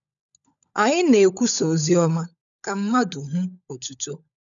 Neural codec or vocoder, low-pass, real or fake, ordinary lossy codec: codec, 16 kHz, 16 kbps, FunCodec, trained on LibriTTS, 50 frames a second; 7.2 kHz; fake; none